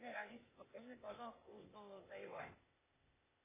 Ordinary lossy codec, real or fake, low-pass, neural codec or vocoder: MP3, 16 kbps; fake; 3.6 kHz; codec, 16 kHz, 0.8 kbps, ZipCodec